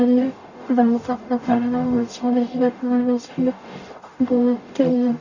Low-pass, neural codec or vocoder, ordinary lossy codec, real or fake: 7.2 kHz; codec, 44.1 kHz, 0.9 kbps, DAC; none; fake